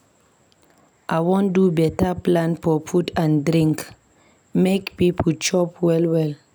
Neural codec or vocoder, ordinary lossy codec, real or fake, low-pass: none; none; real; none